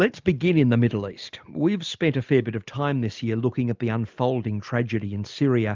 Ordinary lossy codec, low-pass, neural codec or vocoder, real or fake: Opus, 24 kbps; 7.2 kHz; none; real